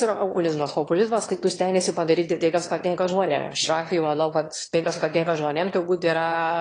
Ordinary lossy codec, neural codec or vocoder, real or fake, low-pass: AAC, 32 kbps; autoencoder, 22.05 kHz, a latent of 192 numbers a frame, VITS, trained on one speaker; fake; 9.9 kHz